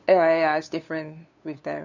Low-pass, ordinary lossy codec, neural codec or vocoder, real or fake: 7.2 kHz; none; codec, 16 kHz, 2 kbps, FunCodec, trained on LibriTTS, 25 frames a second; fake